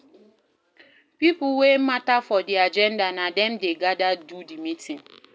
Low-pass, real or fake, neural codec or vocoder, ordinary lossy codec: none; real; none; none